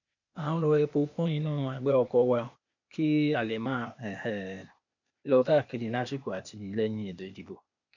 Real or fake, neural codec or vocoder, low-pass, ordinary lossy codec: fake; codec, 16 kHz, 0.8 kbps, ZipCodec; 7.2 kHz; none